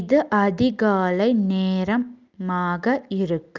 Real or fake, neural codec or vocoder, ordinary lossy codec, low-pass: real; none; Opus, 24 kbps; 7.2 kHz